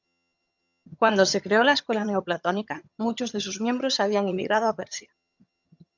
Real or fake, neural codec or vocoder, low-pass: fake; vocoder, 22.05 kHz, 80 mel bands, HiFi-GAN; 7.2 kHz